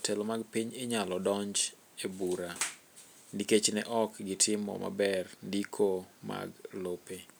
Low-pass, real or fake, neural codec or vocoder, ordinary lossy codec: none; real; none; none